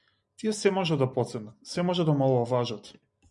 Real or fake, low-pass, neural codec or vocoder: real; 10.8 kHz; none